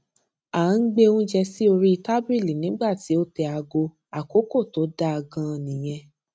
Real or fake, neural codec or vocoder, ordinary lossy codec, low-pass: real; none; none; none